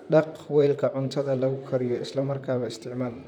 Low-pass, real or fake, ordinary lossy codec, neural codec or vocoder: 19.8 kHz; fake; none; vocoder, 44.1 kHz, 128 mel bands, Pupu-Vocoder